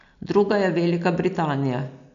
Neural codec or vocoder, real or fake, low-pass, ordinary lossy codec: none; real; 7.2 kHz; none